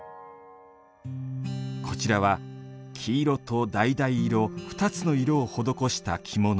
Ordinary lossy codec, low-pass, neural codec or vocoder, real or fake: none; none; none; real